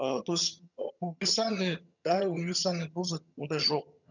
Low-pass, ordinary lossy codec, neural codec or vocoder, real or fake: 7.2 kHz; none; vocoder, 22.05 kHz, 80 mel bands, HiFi-GAN; fake